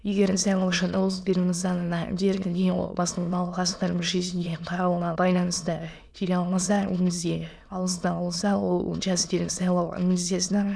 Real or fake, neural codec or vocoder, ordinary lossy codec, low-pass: fake; autoencoder, 22.05 kHz, a latent of 192 numbers a frame, VITS, trained on many speakers; none; none